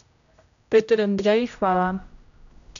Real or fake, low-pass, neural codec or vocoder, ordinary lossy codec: fake; 7.2 kHz; codec, 16 kHz, 0.5 kbps, X-Codec, HuBERT features, trained on general audio; none